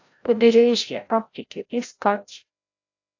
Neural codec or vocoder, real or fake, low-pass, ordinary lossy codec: codec, 16 kHz, 0.5 kbps, FreqCodec, larger model; fake; 7.2 kHz; AAC, 48 kbps